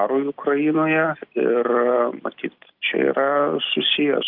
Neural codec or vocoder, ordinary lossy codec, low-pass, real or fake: none; Opus, 24 kbps; 5.4 kHz; real